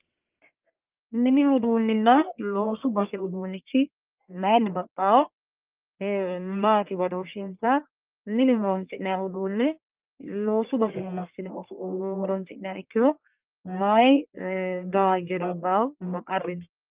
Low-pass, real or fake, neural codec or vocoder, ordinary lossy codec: 3.6 kHz; fake; codec, 44.1 kHz, 1.7 kbps, Pupu-Codec; Opus, 24 kbps